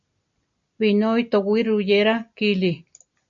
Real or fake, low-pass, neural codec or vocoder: real; 7.2 kHz; none